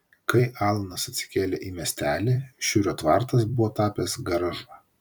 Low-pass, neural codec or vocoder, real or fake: 19.8 kHz; vocoder, 44.1 kHz, 128 mel bands every 256 samples, BigVGAN v2; fake